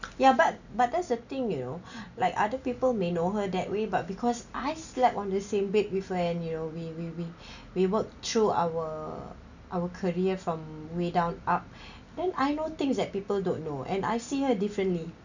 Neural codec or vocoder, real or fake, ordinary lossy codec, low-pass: none; real; none; 7.2 kHz